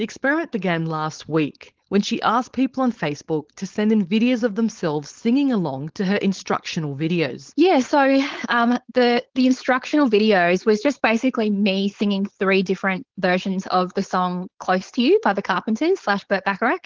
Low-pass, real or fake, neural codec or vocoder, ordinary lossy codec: 7.2 kHz; fake; codec, 16 kHz, 4.8 kbps, FACodec; Opus, 16 kbps